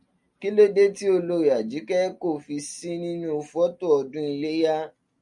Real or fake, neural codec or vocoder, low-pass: real; none; 10.8 kHz